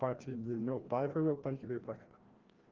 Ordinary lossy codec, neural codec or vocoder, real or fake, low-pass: Opus, 16 kbps; codec, 16 kHz, 1 kbps, FreqCodec, larger model; fake; 7.2 kHz